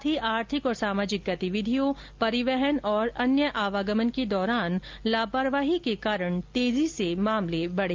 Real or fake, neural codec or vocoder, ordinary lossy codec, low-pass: real; none; Opus, 32 kbps; 7.2 kHz